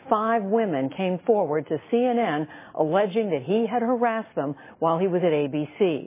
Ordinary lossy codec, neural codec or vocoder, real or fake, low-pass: MP3, 16 kbps; none; real; 3.6 kHz